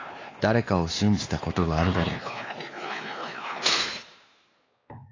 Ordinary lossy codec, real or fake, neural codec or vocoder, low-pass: AAC, 32 kbps; fake; codec, 16 kHz, 2 kbps, X-Codec, WavLM features, trained on Multilingual LibriSpeech; 7.2 kHz